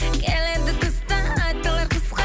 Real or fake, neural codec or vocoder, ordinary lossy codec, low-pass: real; none; none; none